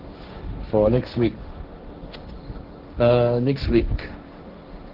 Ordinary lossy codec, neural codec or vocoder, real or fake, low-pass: Opus, 16 kbps; codec, 16 kHz, 1.1 kbps, Voila-Tokenizer; fake; 5.4 kHz